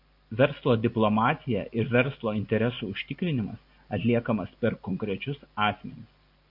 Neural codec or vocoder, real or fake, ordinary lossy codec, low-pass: none; real; MP3, 48 kbps; 5.4 kHz